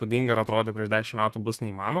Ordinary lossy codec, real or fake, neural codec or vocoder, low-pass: Opus, 64 kbps; fake; codec, 32 kHz, 1.9 kbps, SNAC; 14.4 kHz